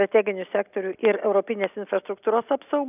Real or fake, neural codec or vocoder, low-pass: real; none; 3.6 kHz